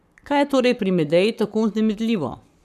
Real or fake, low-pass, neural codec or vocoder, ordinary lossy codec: fake; 14.4 kHz; codec, 44.1 kHz, 7.8 kbps, Pupu-Codec; none